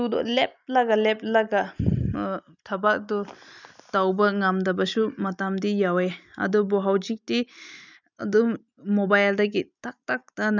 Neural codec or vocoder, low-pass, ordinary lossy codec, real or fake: none; 7.2 kHz; none; real